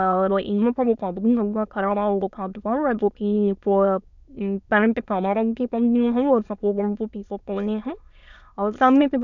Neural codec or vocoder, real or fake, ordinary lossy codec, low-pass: autoencoder, 22.05 kHz, a latent of 192 numbers a frame, VITS, trained on many speakers; fake; none; 7.2 kHz